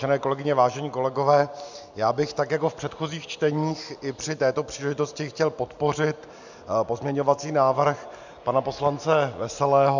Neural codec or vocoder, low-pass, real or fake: none; 7.2 kHz; real